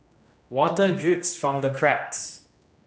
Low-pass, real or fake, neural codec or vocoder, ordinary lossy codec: none; fake; codec, 16 kHz, 1 kbps, X-Codec, HuBERT features, trained on general audio; none